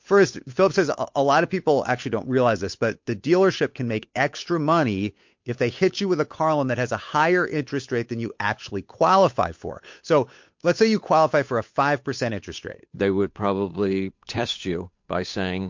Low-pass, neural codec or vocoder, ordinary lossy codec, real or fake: 7.2 kHz; codec, 16 kHz, 8 kbps, FunCodec, trained on Chinese and English, 25 frames a second; MP3, 48 kbps; fake